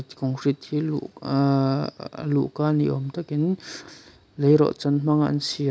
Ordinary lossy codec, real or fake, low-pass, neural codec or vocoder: none; real; none; none